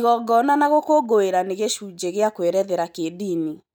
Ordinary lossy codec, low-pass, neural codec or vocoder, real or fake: none; none; none; real